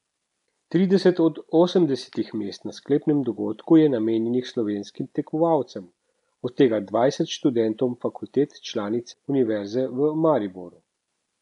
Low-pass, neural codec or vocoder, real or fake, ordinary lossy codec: 10.8 kHz; none; real; none